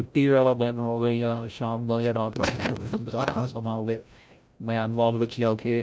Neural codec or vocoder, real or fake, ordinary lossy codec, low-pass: codec, 16 kHz, 0.5 kbps, FreqCodec, larger model; fake; none; none